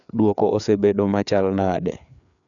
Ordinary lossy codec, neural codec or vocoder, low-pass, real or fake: none; codec, 16 kHz, 4 kbps, FreqCodec, larger model; 7.2 kHz; fake